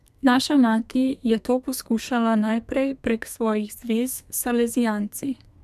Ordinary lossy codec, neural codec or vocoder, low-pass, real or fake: none; codec, 32 kHz, 1.9 kbps, SNAC; 14.4 kHz; fake